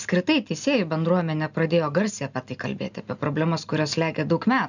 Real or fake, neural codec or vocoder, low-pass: real; none; 7.2 kHz